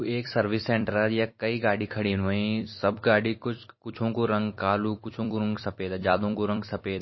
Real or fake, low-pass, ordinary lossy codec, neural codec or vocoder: fake; 7.2 kHz; MP3, 24 kbps; vocoder, 44.1 kHz, 128 mel bands every 256 samples, BigVGAN v2